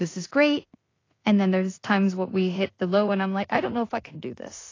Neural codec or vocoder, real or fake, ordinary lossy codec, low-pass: codec, 16 kHz in and 24 kHz out, 0.4 kbps, LongCat-Audio-Codec, two codebook decoder; fake; AAC, 32 kbps; 7.2 kHz